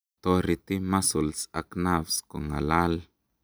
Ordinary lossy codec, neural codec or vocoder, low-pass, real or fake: none; none; none; real